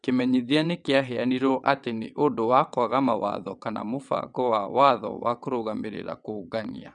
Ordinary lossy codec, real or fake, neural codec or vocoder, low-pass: none; fake; vocoder, 22.05 kHz, 80 mel bands, WaveNeXt; 9.9 kHz